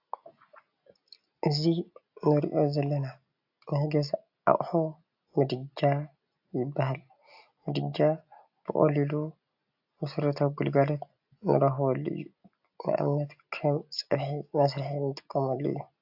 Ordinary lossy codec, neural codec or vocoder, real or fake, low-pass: AAC, 48 kbps; none; real; 5.4 kHz